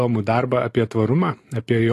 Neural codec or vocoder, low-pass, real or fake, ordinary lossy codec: none; 14.4 kHz; real; AAC, 48 kbps